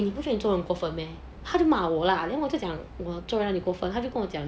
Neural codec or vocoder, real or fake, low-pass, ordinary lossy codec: none; real; none; none